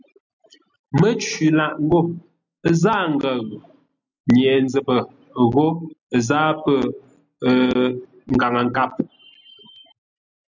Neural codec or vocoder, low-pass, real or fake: none; 7.2 kHz; real